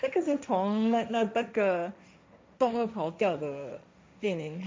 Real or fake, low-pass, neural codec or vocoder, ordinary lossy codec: fake; none; codec, 16 kHz, 1.1 kbps, Voila-Tokenizer; none